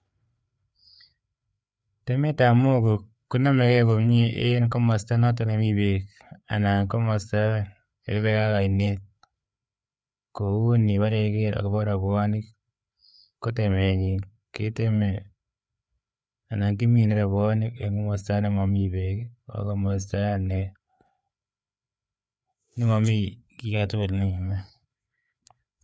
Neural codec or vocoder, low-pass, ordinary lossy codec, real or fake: codec, 16 kHz, 4 kbps, FreqCodec, larger model; none; none; fake